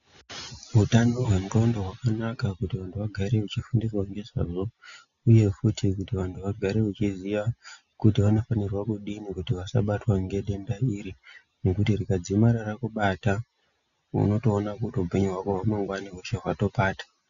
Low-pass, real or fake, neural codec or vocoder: 7.2 kHz; real; none